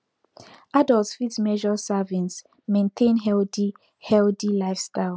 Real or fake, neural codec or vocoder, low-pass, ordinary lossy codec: real; none; none; none